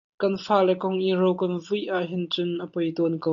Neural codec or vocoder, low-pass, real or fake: none; 7.2 kHz; real